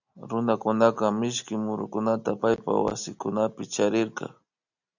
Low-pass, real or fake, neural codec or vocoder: 7.2 kHz; real; none